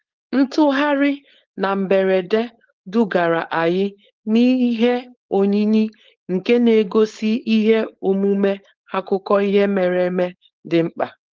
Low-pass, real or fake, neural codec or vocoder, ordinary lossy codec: 7.2 kHz; fake; codec, 16 kHz, 4.8 kbps, FACodec; Opus, 16 kbps